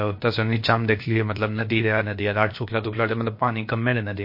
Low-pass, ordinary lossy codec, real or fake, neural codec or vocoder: 5.4 kHz; MP3, 32 kbps; fake; codec, 16 kHz, about 1 kbps, DyCAST, with the encoder's durations